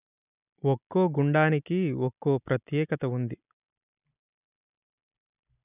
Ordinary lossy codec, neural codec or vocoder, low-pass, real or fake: none; none; 3.6 kHz; real